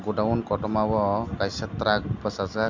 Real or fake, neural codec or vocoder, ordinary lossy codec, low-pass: real; none; Opus, 64 kbps; 7.2 kHz